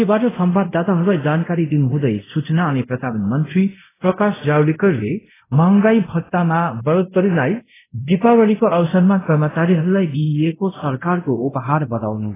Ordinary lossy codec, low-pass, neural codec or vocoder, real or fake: AAC, 16 kbps; 3.6 kHz; codec, 24 kHz, 0.5 kbps, DualCodec; fake